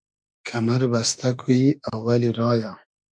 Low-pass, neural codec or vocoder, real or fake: 9.9 kHz; autoencoder, 48 kHz, 32 numbers a frame, DAC-VAE, trained on Japanese speech; fake